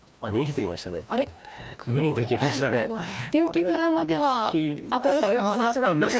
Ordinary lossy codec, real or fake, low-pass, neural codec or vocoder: none; fake; none; codec, 16 kHz, 1 kbps, FreqCodec, larger model